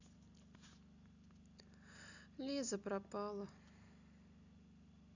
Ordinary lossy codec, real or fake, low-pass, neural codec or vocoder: none; real; 7.2 kHz; none